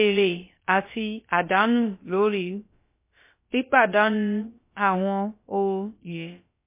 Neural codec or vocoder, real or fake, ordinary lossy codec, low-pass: codec, 16 kHz, about 1 kbps, DyCAST, with the encoder's durations; fake; MP3, 24 kbps; 3.6 kHz